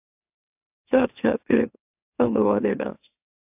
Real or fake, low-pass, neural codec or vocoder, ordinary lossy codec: fake; 3.6 kHz; autoencoder, 44.1 kHz, a latent of 192 numbers a frame, MeloTTS; AAC, 32 kbps